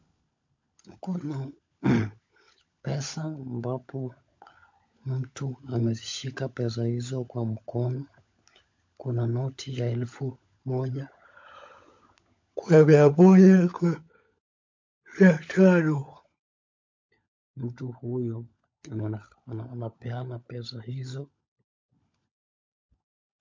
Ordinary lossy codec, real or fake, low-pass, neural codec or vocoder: MP3, 48 kbps; fake; 7.2 kHz; codec, 16 kHz, 16 kbps, FunCodec, trained on LibriTTS, 50 frames a second